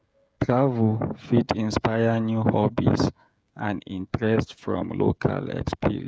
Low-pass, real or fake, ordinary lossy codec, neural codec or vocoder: none; fake; none; codec, 16 kHz, 16 kbps, FreqCodec, smaller model